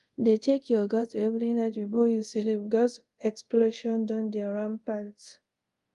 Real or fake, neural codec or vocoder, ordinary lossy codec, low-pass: fake; codec, 24 kHz, 0.5 kbps, DualCodec; Opus, 32 kbps; 10.8 kHz